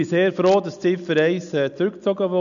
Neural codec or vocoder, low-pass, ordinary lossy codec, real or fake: none; 7.2 kHz; none; real